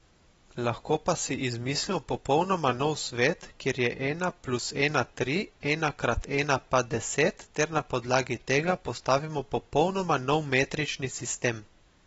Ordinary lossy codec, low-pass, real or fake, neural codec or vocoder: AAC, 24 kbps; 19.8 kHz; real; none